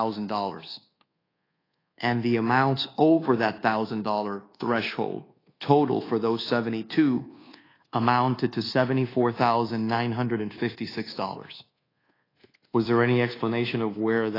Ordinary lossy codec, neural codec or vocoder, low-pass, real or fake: AAC, 24 kbps; codec, 24 kHz, 1.2 kbps, DualCodec; 5.4 kHz; fake